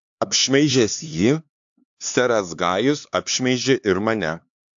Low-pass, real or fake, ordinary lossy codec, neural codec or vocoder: 7.2 kHz; fake; AAC, 64 kbps; codec, 16 kHz, 4 kbps, X-Codec, HuBERT features, trained on LibriSpeech